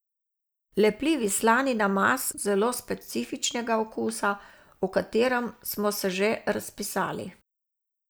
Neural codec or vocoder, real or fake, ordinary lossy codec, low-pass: none; real; none; none